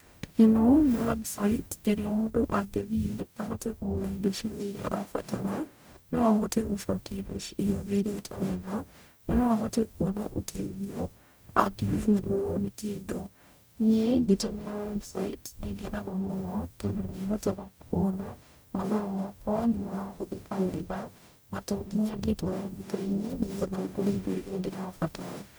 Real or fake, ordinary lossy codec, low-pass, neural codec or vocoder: fake; none; none; codec, 44.1 kHz, 0.9 kbps, DAC